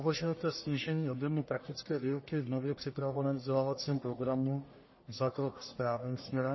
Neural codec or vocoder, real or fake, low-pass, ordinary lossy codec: codec, 44.1 kHz, 1.7 kbps, Pupu-Codec; fake; 7.2 kHz; MP3, 24 kbps